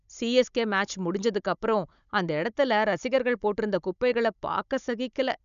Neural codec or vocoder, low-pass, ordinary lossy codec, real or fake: codec, 16 kHz, 16 kbps, FunCodec, trained on Chinese and English, 50 frames a second; 7.2 kHz; none; fake